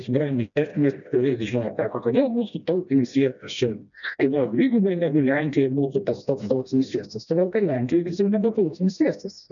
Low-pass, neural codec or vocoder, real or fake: 7.2 kHz; codec, 16 kHz, 1 kbps, FreqCodec, smaller model; fake